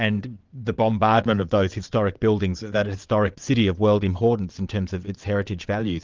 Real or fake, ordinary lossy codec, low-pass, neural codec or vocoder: fake; Opus, 24 kbps; 7.2 kHz; vocoder, 22.05 kHz, 80 mel bands, WaveNeXt